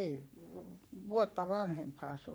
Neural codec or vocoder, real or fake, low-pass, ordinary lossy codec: codec, 44.1 kHz, 3.4 kbps, Pupu-Codec; fake; none; none